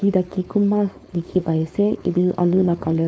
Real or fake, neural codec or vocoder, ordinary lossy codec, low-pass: fake; codec, 16 kHz, 4.8 kbps, FACodec; none; none